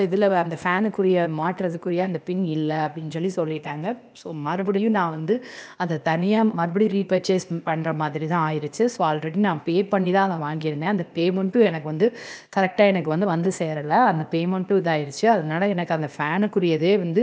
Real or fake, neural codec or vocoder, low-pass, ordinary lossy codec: fake; codec, 16 kHz, 0.8 kbps, ZipCodec; none; none